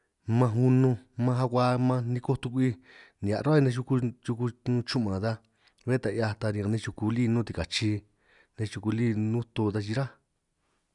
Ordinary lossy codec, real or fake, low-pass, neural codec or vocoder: none; real; 10.8 kHz; none